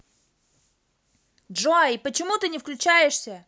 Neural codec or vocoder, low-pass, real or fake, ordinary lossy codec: none; none; real; none